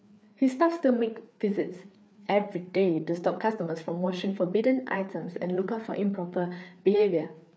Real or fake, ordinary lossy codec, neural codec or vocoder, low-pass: fake; none; codec, 16 kHz, 4 kbps, FreqCodec, larger model; none